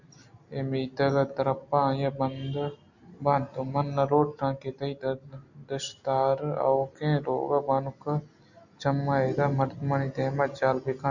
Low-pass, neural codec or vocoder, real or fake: 7.2 kHz; none; real